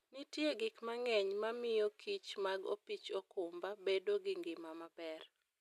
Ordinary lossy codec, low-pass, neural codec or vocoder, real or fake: none; 14.4 kHz; none; real